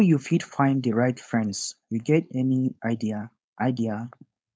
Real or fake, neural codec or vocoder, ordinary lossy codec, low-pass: fake; codec, 16 kHz, 4.8 kbps, FACodec; none; none